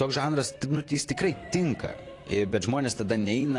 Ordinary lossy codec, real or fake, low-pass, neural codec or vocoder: AAC, 48 kbps; fake; 10.8 kHz; vocoder, 44.1 kHz, 128 mel bands, Pupu-Vocoder